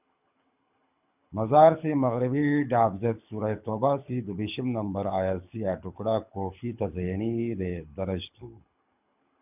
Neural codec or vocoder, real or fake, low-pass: codec, 24 kHz, 6 kbps, HILCodec; fake; 3.6 kHz